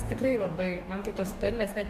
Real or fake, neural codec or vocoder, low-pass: fake; codec, 44.1 kHz, 2.6 kbps, DAC; 14.4 kHz